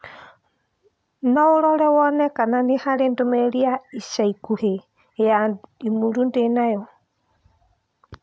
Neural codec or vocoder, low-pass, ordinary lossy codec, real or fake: none; none; none; real